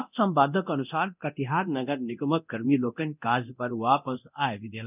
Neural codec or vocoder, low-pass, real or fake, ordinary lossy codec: codec, 24 kHz, 0.9 kbps, DualCodec; 3.6 kHz; fake; none